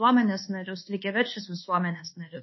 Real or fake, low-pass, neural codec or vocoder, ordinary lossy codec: fake; 7.2 kHz; codec, 24 kHz, 1.2 kbps, DualCodec; MP3, 24 kbps